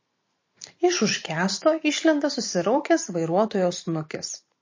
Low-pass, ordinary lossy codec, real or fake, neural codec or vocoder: 7.2 kHz; MP3, 32 kbps; fake; vocoder, 44.1 kHz, 128 mel bands every 512 samples, BigVGAN v2